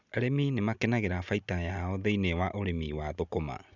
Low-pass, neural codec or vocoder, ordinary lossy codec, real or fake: 7.2 kHz; none; none; real